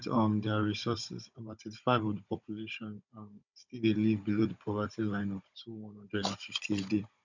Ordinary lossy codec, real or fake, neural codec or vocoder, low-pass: none; fake; codec, 16 kHz, 16 kbps, FunCodec, trained on Chinese and English, 50 frames a second; 7.2 kHz